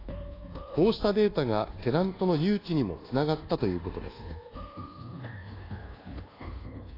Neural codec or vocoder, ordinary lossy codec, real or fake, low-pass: codec, 24 kHz, 1.2 kbps, DualCodec; AAC, 24 kbps; fake; 5.4 kHz